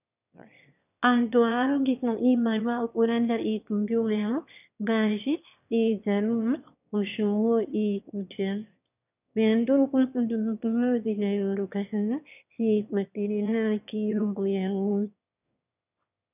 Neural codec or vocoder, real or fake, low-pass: autoencoder, 22.05 kHz, a latent of 192 numbers a frame, VITS, trained on one speaker; fake; 3.6 kHz